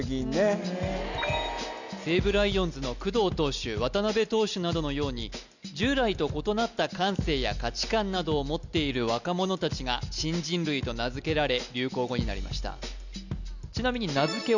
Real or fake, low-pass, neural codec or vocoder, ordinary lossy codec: real; 7.2 kHz; none; none